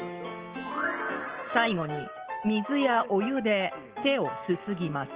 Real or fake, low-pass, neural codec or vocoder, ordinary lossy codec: real; 3.6 kHz; none; Opus, 16 kbps